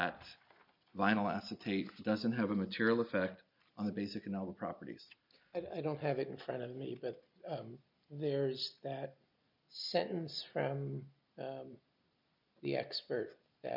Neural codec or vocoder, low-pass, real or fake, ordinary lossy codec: none; 5.4 kHz; real; MP3, 48 kbps